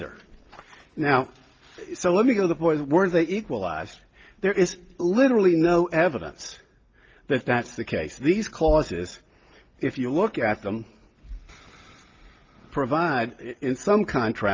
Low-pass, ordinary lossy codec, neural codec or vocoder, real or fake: 7.2 kHz; Opus, 24 kbps; none; real